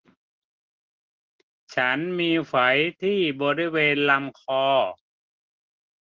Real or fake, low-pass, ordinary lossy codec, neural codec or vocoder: real; 7.2 kHz; Opus, 16 kbps; none